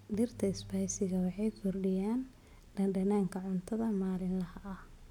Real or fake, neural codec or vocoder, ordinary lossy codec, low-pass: real; none; none; 19.8 kHz